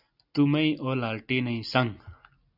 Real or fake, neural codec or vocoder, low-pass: real; none; 5.4 kHz